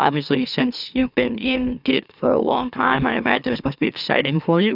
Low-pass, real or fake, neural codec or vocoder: 5.4 kHz; fake; autoencoder, 44.1 kHz, a latent of 192 numbers a frame, MeloTTS